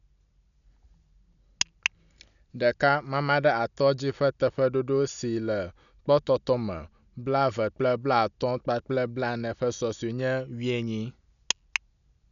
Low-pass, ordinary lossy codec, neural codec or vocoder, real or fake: 7.2 kHz; none; none; real